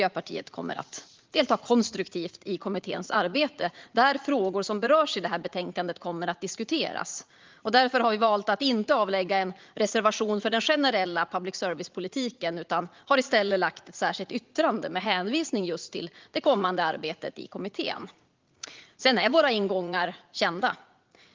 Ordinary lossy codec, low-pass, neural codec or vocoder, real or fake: Opus, 32 kbps; 7.2 kHz; vocoder, 44.1 kHz, 80 mel bands, Vocos; fake